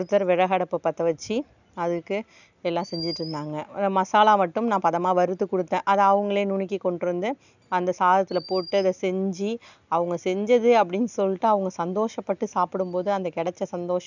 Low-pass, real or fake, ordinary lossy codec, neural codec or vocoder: 7.2 kHz; real; none; none